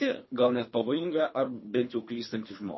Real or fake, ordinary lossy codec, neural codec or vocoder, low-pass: fake; MP3, 24 kbps; codec, 24 kHz, 3 kbps, HILCodec; 7.2 kHz